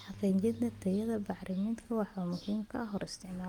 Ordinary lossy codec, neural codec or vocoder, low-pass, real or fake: Opus, 32 kbps; none; 19.8 kHz; real